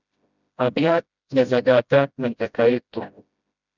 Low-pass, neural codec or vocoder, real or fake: 7.2 kHz; codec, 16 kHz, 0.5 kbps, FreqCodec, smaller model; fake